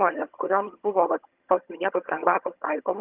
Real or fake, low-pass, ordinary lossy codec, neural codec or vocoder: fake; 3.6 kHz; Opus, 24 kbps; vocoder, 22.05 kHz, 80 mel bands, HiFi-GAN